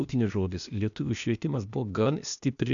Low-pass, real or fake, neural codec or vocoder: 7.2 kHz; fake; codec, 16 kHz, 0.8 kbps, ZipCodec